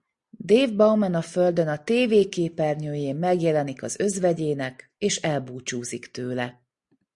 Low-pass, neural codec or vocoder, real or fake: 10.8 kHz; none; real